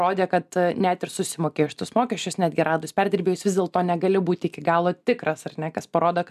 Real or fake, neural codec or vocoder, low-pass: real; none; 14.4 kHz